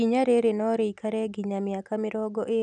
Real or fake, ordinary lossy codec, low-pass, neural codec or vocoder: real; none; 9.9 kHz; none